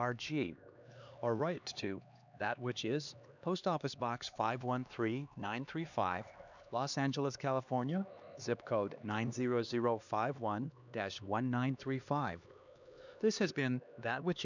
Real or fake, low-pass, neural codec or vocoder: fake; 7.2 kHz; codec, 16 kHz, 2 kbps, X-Codec, HuBERT features, trained on LibriSpeech